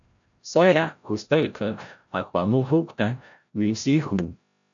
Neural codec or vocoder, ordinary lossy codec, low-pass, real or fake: codec, 16 kHz, 0.5 kbps, FreqCodec, larger model; AAC, 64 kbps; 7.2 kHz; fake